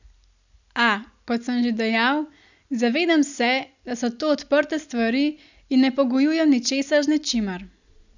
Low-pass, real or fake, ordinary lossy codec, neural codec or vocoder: 7.2 kHz; real; none; none